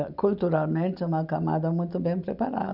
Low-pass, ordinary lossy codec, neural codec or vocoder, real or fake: 5.4 kHz; none; none; real